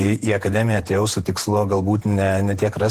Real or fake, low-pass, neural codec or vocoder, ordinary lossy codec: fake; 14.4 kHz; vocoder, 48 kHz, 128 mel bands, Vocos; Opus, 16 kbps